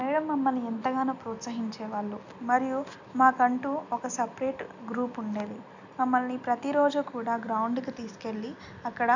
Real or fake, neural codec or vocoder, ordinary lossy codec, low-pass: real; none; none; 7.2 kHz